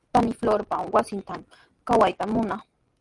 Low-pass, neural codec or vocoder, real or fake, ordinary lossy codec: 10.8 kHz; none; real; Opus, 32 kbps